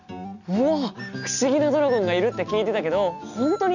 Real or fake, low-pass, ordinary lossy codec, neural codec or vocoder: real; 7.2 kHz; none; none